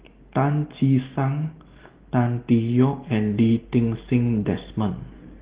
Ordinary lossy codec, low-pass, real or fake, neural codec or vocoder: Opus, 16 kbps; 3.6 kHz; real; none